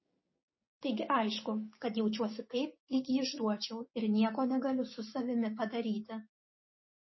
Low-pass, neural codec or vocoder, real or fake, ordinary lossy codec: 7.2 kHz; codec, 16 kHz, 6 kbps, DAC; fake; MP3, 24 kbps